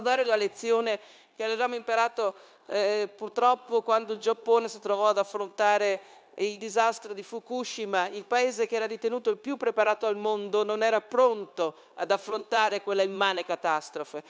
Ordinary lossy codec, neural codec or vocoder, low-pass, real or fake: none; codec, 16 kHz, 0.9 kbps, LongCat-Audio-Codec; none; fake